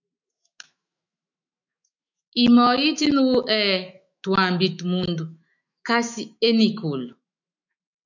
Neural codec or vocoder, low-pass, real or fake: autoencoder, 48 kHz, 128 numbers a frame, DAC-VAE, trained on Japanese speech; 7.2 kHz; fake